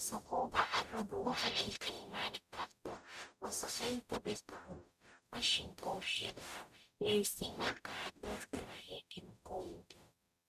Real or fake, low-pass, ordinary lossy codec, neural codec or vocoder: fake; 14.4 kHz; none; codec, 44.1 kHz, 0.9 kbps, DAC